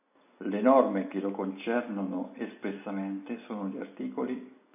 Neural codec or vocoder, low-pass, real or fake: none; 3.6 kHz; real